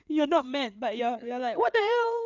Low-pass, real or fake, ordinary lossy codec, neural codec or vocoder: 7.2 kHz; fake; none; codec, 16 kHz in and 24 kHz out, 2.2 kbps, FireRedTTS-2 codec